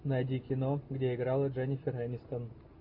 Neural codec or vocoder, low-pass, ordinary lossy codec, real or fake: none; 5.4 kHz; AAC, 48 kbps; real